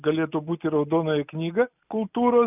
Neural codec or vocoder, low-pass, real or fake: none; 3.6 kHz; real